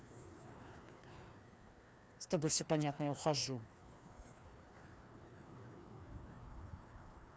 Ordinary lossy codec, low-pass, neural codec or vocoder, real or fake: none; none; codec, 16 kHz, 2 kbps, FreqCodec, larger model; fake